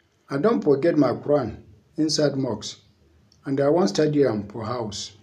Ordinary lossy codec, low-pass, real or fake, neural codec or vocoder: none; 14.4 kHz; real; none